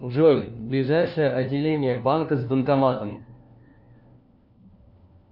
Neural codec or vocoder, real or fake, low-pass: codec, 16 kHz, 1 kbps, FunCodec, trained on LibriTTS, 50 frames a second; fake; 5.4 kHz